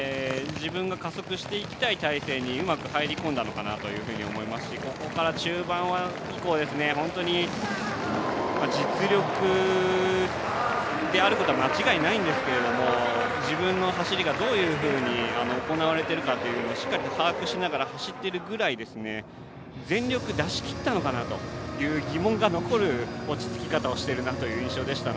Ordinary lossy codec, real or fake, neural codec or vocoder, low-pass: none; real; none; none